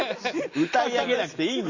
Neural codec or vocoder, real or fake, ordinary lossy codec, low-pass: none; real; Opus, 64 kbps; 7.2 kHz